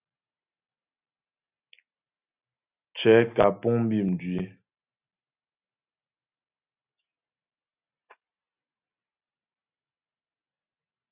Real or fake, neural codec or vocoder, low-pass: real; none; 3.6 kHz